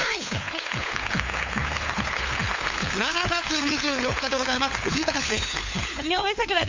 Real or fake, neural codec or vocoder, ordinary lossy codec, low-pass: fake; codec, 16 kHz, 4 kbps, FunCodec, trained on LibriTTS, 50 frames a second; MP3, 64 kbps; 7.2 kHz